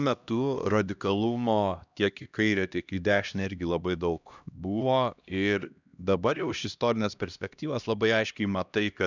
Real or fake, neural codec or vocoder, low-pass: fake; codec, 16 kHz, 1 kbps, X-Codec, HuBERT features, trained on LibriSpeech; 7.2 kHz